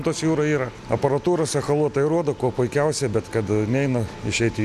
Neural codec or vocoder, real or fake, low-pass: none; real; 14.4 kHz